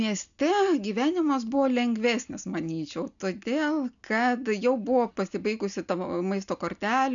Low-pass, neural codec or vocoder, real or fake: 7.2 kHz; none; real